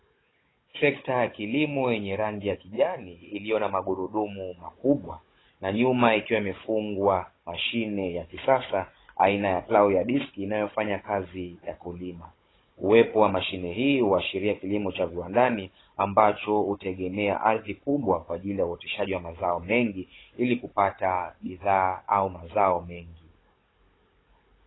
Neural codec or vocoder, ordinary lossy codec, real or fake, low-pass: codec, 16 kHz, 16 kbps, FunCodec, trained on Chinese and English, 50 frames a second; AAC, 16 kbps; fake; 7.2 kHz